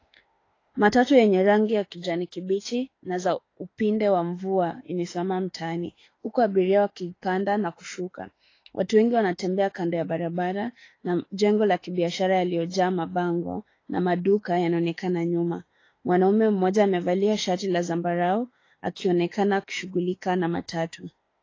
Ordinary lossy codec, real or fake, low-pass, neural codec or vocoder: AAC, 32 kbps; fake; 7.2 kHz; autoencoder, 48 kHz, 32 numbers a frame, DAC-VAE, trained on Japanese speech